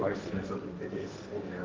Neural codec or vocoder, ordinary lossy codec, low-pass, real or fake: codec, 16 kHz, 1.1 kbps, Voila-Tokenizer; Opus, 32 kbps; 7.2 kHz; fake